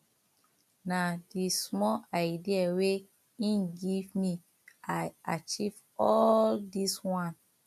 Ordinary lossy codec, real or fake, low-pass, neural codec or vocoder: none; real; 14.4 kHz; none